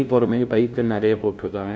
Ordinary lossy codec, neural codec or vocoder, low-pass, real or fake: none; codec, 16 kHz, 0.5 kbps, FunCodec, trained on LibriTTS, 25 frames a second; none; fake